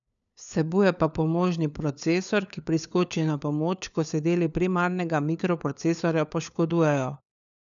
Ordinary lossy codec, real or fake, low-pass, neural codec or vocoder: none; fake; 7.2 kHz; codec, 16 kHz, 4 kbps, FunCodec, trained on LibriTTS, 50 frames a second